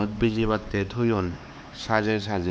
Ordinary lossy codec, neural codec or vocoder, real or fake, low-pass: none; codec, 16 kHz, 4 kbps, X-Codec, HuBERT features, trained on LibriSpeech; fake; none